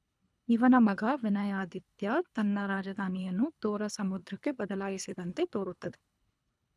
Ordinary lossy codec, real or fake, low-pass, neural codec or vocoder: none; fake; none; codec, 24 kHz, 3 kbps, HILCodec